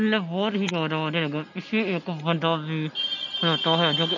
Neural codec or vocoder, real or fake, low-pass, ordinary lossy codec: none; real; 7.2 kHz; none